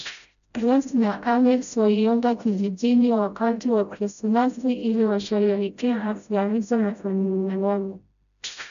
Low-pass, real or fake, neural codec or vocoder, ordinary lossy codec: 7.2 kHz; fake; codec, 16 kHz, 0.5 kbps, FreqCodec, smaller model; none